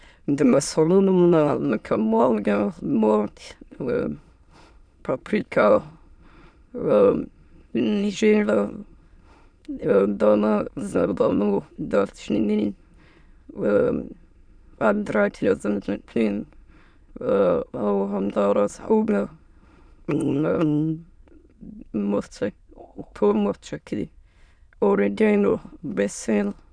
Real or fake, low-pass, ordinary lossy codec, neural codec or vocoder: fake; 9.9 kHz; none; autoencoder, 22.05 kHz, a latent of 192 numbers a frame, VITS, trained on many speakers